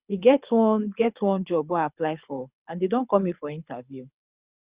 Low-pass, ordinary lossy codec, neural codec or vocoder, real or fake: 3.6 kHz; Opus, 64 kbps; codec, 24 kHz, 6 kbps, HILCodec; fake